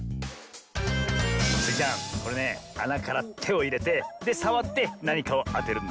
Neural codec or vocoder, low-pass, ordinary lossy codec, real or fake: none; none; none; real